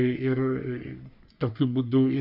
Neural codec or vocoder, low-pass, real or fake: codec, 44.1 kHz, 3.4 kbps, Pupu-Codec; 5.4 kHz; fake